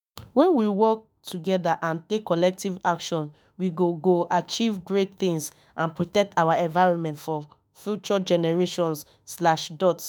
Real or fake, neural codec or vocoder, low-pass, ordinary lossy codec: fake; autoencoder, 48 kHz, 32 numbers a frame, DAC-VAE, trained on Japanese speech; none; none